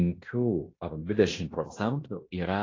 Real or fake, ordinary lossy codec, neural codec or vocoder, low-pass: fake; AAC, 32 kbps; codec, 16 kHz in and 24 kHz out, 0.9 kbps, LongCat-Audio-Codec, fine tuned four codebook decoder; 7.2 kHz